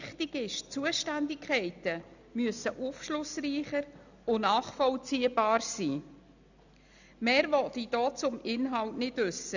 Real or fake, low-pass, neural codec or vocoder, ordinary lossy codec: real; 7.2 kHz; none; none